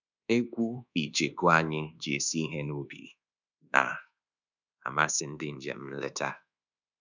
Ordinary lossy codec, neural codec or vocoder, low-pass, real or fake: none; codec, 24 kHz, 1.2 kbps, DualCodec; 7.2 kHz; fake